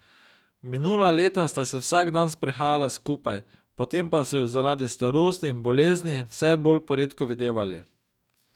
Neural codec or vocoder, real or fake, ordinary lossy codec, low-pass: codec, 44.1 kHz, 2.6 kbps, DAC; fake; none; 19.8 kHz